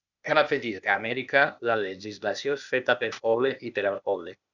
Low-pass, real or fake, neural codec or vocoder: 7.2 kHz; fake; codec, 16 kHz, 0.8 kbps, ZipCodec